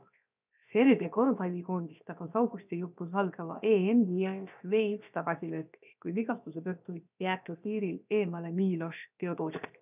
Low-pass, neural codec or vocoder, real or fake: 3.6 kHz; codec, 16 kHz, 0.7 kbps, FocalCodec; fake